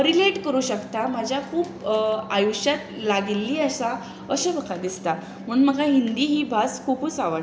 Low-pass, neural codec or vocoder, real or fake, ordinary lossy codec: none; none; real; none